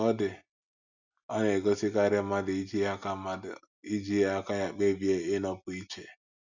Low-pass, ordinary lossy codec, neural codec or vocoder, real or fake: 7.2 kHz; none; none; real